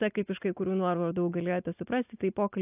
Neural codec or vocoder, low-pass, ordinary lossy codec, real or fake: none; 3.6 kHz; AAC, 32 kbps; real